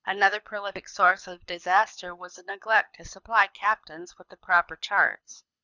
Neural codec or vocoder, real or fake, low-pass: codec, 24 kHz, 6 kbps, HILCodec; fake; 7.2 kHz